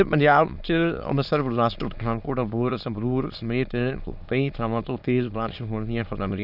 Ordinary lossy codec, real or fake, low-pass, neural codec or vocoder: none; fake; 5.4 kHz; autoencoder, 22.05 kHz, a latent of 192 numbers a frame, VITS, trained on many speakers